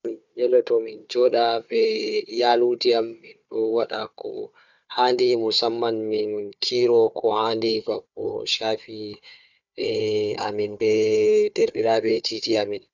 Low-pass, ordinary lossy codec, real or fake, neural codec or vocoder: 7.2 kHz; none; fake; codec, 16 kHz, 4 kbps, FunCodec, trained on Chinese and English, 50 frames a second